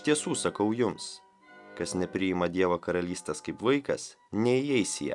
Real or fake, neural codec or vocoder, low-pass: real; none; 10.8 kHz